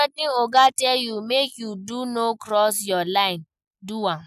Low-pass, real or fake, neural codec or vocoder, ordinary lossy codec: 14.4 kHz; real; none; none